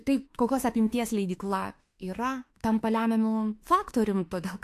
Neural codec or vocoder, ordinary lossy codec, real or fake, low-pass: autoencoder, 48 kHz, 32 numbers a frame, DAC-VAE, trained on Japanese speech; AAC, 64 kbps; fake; 14.4 kHz